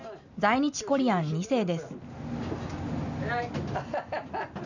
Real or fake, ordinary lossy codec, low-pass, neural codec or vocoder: real; none; 7.2 kHz; none